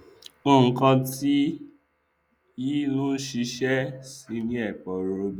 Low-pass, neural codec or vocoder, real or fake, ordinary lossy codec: 19.8 kHz; none; real; none